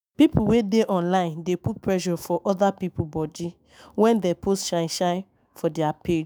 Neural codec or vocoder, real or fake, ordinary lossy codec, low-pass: autoencoder, 48 kHz, 128 numbers a frame, DAC-VAE, trained on Japanese speech; fake; none; none